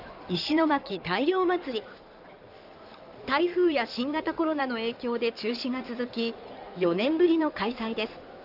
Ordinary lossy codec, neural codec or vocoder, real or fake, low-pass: none; codec, 16 kHz in and 24 kHz out, 2.2 kbps, FireRedTTS-2 codec; fake; 5.4 kHz